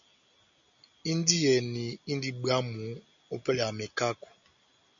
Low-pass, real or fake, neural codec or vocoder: 7.2 kHz; real; none